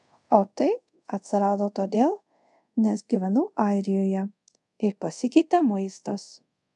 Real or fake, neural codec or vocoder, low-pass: fake; codec, 24 kHz, 0.5 kbps, DualCodec; 10.8 kHz